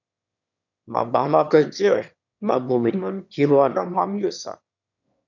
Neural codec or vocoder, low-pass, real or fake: autoencoder, 22.05 kHz, a latent of 192 numbers a frame, VITS, trained on one speaker; 7.2 kHz; fake